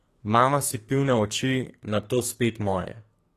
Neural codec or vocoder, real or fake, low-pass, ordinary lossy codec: codec, 44.1 kHz, 2.6 kbps, SNAC; fake; 14.4 kHz; AAC, 48 kbps